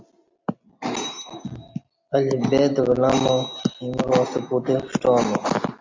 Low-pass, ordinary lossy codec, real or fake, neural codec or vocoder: 7.2 kHz; MP3, 64 kbps; real; none